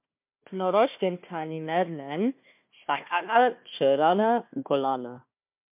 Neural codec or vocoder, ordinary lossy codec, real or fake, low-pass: codec, 16 kHz, 1 kbps, FunCodec, trained on Chinese and English, 50 frames a second; MP3, 32 kbps; fake; 3.6 kHz